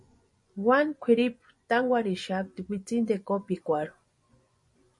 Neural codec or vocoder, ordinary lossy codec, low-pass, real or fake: vocoder, 24 kHz, 100 mel bands, Vocos; MP3, 48 kbps; 10.8 kHz; fake